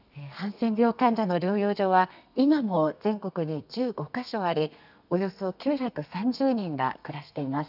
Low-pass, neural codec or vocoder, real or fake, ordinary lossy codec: 5.4 kHz; codec, 32 kHz, 1.9 kbps, SNAC; fake; none